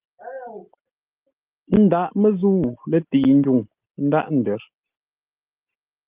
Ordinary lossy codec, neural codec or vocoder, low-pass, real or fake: Opus, 32 kbps; none; 3.6 kHz; real